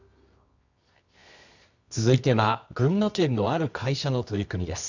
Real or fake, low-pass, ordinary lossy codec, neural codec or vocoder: fake; 7.2 kHz; none; codec, 24 kHz, 0.9 kbps, WavTokenizer, medium music audio release